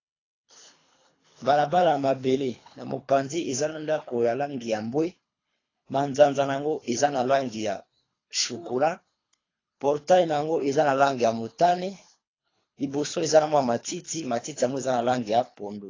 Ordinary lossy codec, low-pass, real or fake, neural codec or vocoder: AAC, 32 kbps; 7.2 kHz; fake; codec, 24 kHz, 3 kbps, HILCodec